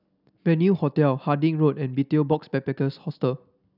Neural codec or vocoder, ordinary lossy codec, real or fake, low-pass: none; none; real; 5.4 kHz